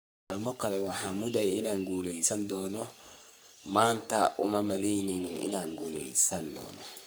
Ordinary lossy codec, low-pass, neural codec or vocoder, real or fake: none; none; codec, 44.1 kHz, 3.4 kbps, Pupu-Codec; fake